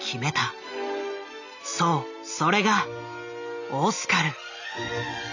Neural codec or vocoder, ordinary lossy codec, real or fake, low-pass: none; none; real; 7.2 kHz